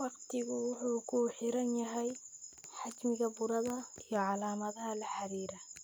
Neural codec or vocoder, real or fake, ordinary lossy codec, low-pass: none; real; none; none